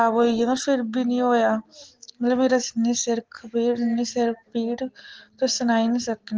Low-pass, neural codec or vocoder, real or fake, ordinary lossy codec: 7.2 kHz; none; real; Opus, 16 kbps